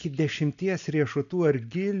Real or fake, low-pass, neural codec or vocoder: real; 7.2 kHz; none